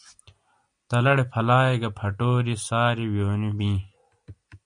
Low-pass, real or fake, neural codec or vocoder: 9.9 kHz; real; none